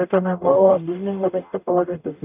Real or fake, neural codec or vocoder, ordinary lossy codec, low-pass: fake; codec, 44.1 kHz, 0.9 kbps, DAC; none; 3.6 kHz